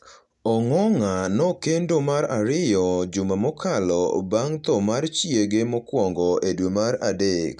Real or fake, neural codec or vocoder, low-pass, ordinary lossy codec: real; none; 10.8 kHz; none